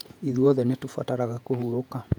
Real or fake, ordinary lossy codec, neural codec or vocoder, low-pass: fake; none; vocoder, 48 kHz, 128 mel bands, Vocos; 19.8 kHz